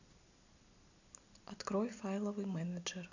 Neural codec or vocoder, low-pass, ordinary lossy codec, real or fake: none; 7.2 kHz; none; real